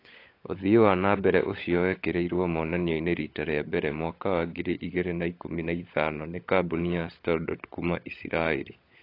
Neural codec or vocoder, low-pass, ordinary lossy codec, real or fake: codec, 16 kHz, 16 kbps, FunCodec, trained on LibriTTS, 50 frames a second; 5.4 kHz; AAC, 32 kbps; fake